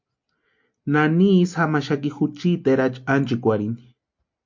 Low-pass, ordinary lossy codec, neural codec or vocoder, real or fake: 7.2 kHz; MP3, 48 kbps; none; real